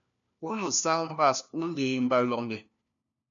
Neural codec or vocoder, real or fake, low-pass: codec, 16 kHz, 1 kbps, FunCodec, trained on LibriTTS, 50 frames a second; fake; 7.2 kHz